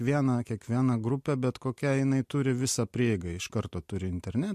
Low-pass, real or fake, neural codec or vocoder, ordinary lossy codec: 14.4 kHz; real; none; MP3, 64 kbps